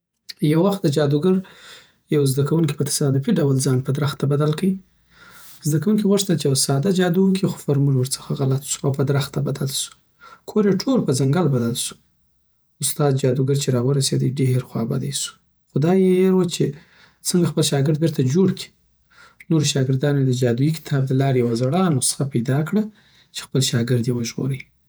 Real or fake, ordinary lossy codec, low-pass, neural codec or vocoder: fake; none; none; vocoder, 48 kHz, 128 mel bands, Vocos